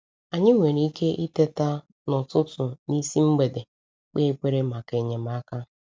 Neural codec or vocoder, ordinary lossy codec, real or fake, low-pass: none; none; real; none